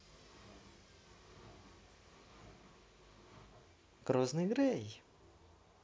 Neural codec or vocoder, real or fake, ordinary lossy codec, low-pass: none; real; none; none